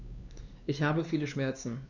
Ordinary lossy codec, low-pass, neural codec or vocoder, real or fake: none; 7.2 kHz; codec, 16 kHz, 2 kbps, X-Codec, WavLM features, trained on Multilingual LibriSpeech; fake